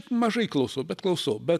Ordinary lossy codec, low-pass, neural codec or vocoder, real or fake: MP3, 96 kbps; 14.4 kHz; none; real